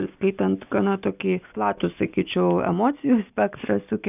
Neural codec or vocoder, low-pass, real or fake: none; 3.6 kHz; real